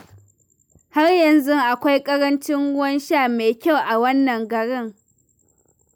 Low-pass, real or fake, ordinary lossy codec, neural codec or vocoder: none; real; none; none